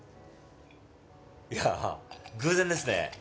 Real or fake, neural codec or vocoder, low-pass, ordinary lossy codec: real; none; none; none